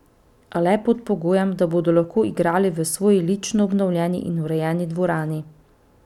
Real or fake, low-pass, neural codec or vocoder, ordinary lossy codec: real; 19.8 kHz; none; none